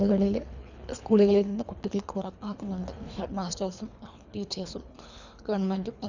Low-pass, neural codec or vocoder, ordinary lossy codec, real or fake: 7.2 kHz; codec, 24 kHz, 3 kbps, HILCodec; none; fake